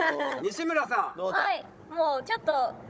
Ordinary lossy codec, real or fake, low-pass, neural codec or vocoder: none; fake; none; codec, 16 kHz, 16 kbps, FunCodec, trained on Chinese and English, 50 frames a second